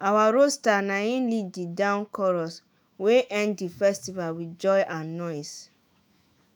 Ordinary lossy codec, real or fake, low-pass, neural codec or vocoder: none; fake; none; autoencoder, 48 kHz, 128 numbers a frame, DAC-VAE, trained on Japanese speech